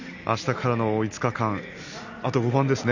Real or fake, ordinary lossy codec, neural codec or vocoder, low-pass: real; none; none; 7.2 kHz